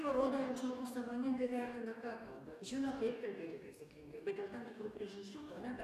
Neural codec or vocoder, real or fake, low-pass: codec, 44.1 kHz, 2.6 kbps, DAC; fake; 14.4 kHz